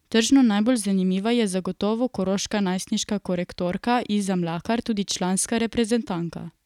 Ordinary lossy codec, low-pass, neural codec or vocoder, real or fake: none; 19.8 kHz; none; real